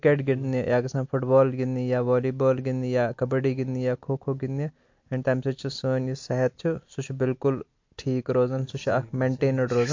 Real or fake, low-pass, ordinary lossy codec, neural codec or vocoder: real; 7.2 kHz; MP3, 48 kbps; none